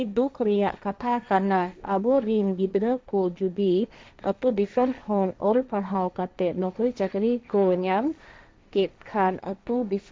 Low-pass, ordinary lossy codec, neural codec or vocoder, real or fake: none; none; codec, 16 kHz, 1.1 kbps, Voila-Tokenizer; fake